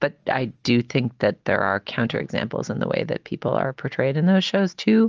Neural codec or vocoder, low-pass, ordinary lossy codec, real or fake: none; 7.2 kHz; Opus, 24 kbps; real